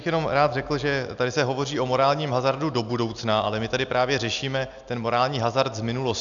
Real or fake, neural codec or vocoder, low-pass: real; none; 7.2 kHz